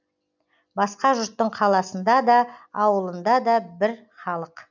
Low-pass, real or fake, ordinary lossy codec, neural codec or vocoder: 7.2 kHz; real; none; none